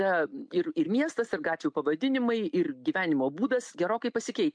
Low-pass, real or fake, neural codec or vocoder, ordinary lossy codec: 9.9 kHz; real; none; MP3, 64 kbps